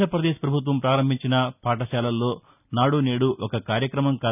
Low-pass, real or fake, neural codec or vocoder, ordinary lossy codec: 3.6 kHz; real; none; none